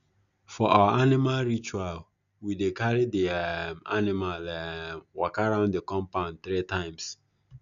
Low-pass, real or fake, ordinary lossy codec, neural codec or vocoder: 7.2 kHz; real; none; none